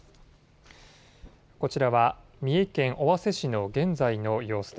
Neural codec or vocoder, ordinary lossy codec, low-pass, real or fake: none; none; none; real